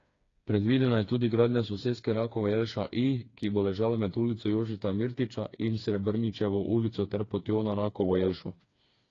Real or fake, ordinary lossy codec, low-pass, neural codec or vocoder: fake; AAC, 32 kbps; 7.2 kHz; codec, 16 kHz, 4 kbps, FreqCodec, smaller model